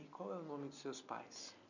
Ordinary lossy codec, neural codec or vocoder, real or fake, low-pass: none; none; real; 7.2 kHz